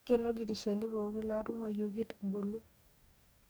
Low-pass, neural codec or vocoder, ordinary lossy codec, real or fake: none; codec, 44.1 kHz, 2.6 kbps, DAC; none; fake